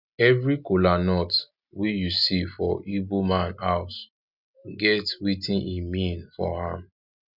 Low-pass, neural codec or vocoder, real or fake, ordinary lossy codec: 5.4 kHz; none; real; none